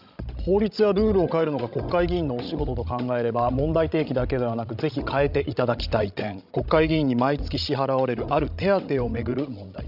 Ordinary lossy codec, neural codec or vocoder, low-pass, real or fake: none; codec, 16 kHz, 16 kbps, FreqCodec, larger model; 5.4 kHz; fake